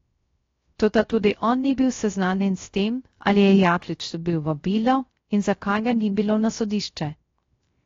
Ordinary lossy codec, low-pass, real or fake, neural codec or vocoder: AAC, 32 kbps; 7.2 kHz; fake; codec, 16 kHz, 0.3 kbps, FocalCodec